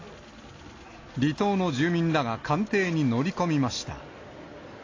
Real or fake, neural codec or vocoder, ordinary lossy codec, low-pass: real; none; AAC, 32 kbps; 7.2 kHz